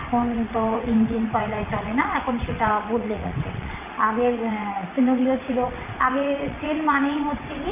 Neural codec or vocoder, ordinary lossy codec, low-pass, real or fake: vocoder, 22.05 kHz, 80 mel bands, WaveNeXt; none; 3.6 kHz; fake